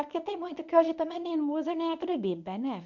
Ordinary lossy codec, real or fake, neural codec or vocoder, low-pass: none; fake; codec, 24 kHz, 0.9 kbps, WavTokenizer, medium speech release version 1; 7.2 kHz